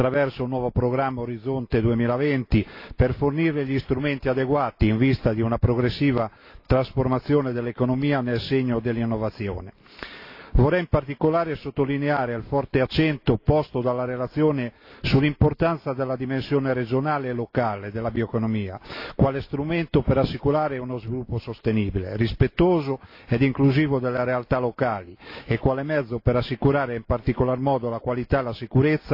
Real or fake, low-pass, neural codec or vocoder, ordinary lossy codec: real; 5.4 kHz; none; AAC, 32 kbps